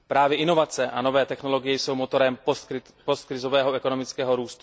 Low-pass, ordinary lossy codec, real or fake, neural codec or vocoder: none; none; real; none